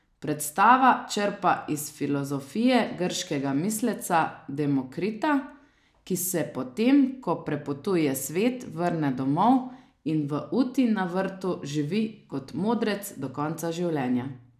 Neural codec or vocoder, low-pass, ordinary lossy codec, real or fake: none; 14.4 kHz; none; real